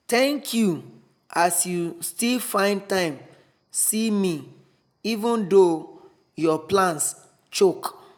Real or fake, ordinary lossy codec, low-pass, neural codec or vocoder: real; none; none; none